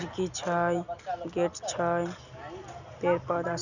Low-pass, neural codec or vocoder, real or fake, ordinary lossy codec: 7.2 kHz; none; real; none